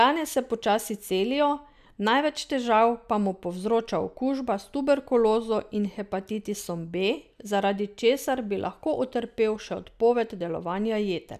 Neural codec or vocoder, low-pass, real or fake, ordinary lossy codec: none; 14.4 kHz; real; none